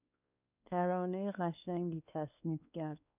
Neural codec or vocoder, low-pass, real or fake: codec, 16 kHz, 2 kbps, X-Codec, WavLM features, trained on Multilingual LibriSpeech; 3.6 kHz; fake